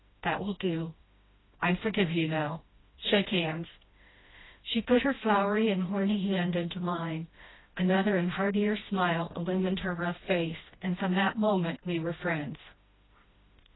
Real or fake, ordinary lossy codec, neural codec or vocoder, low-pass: fake; AAC, 16 kbps; codec, 16 kHz, 1 kbps, FreqCodec, smaller model; 7.2 kHz